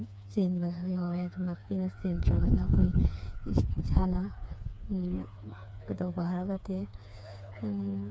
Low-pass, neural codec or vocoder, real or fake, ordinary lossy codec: none; codec, 16 kHz, 4 kbps, FreqCodec, smaller model; fake; none